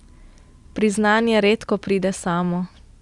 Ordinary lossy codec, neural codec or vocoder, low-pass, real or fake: Opus, 64 kbps; none; 10.8 kHz; real